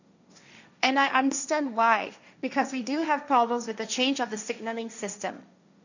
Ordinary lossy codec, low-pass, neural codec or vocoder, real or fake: none; 7.2 kHz; codec, 16 kHz, 1.1 kbps, Voila-Tokenizer; fake